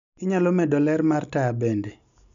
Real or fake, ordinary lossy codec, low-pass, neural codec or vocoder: real; none; 7.2 kHz; none